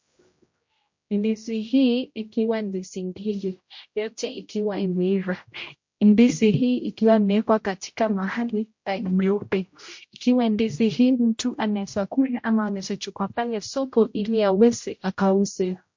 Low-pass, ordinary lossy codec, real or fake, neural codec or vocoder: 7.2 kHz; MP3, 48 kbps; fake; codec, 16 kHz, 0.5 kbps, X-Codec, HuBERT features, trained on general audio